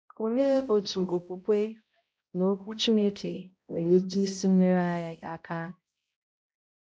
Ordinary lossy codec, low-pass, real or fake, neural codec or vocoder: none; none; fake; codec, 16 kHz, 0.5 kbps, X-Codec, HuBERT features, trained on balanced general audio